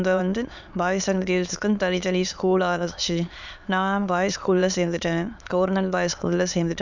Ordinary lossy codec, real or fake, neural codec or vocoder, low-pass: none; fake; autoencoder, 22.05 kHz, a latent of 192 numbers a frame, VITS, trained on many speakers; 7.2 kHz